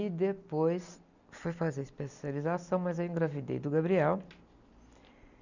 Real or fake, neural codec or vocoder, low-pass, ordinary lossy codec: real; none; 7.2 kHz; none